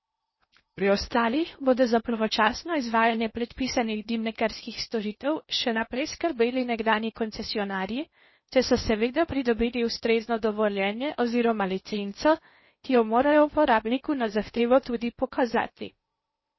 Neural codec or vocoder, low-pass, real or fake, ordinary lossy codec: codec, 16 kHz in and 24 kHz out, 0.6 kbps, FocalCodec, streaming, 2048 codes; 7.2 kHz; fake; MP3, 24 kbps